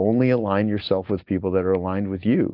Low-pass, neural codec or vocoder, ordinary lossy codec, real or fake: 5.4 kHz; none; Opus, 24 kbps; real